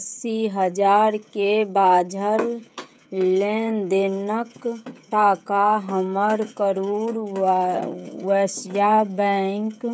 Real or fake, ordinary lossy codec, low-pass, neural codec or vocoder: fake; none; none; codec, 16 kHz, 16 kbps, FreqCodec, smaller model